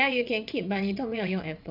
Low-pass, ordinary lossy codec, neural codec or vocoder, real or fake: 5.4 kHz; none; vocoder, 22.05 kHz, 80 mel bands, WaveNeXt; fake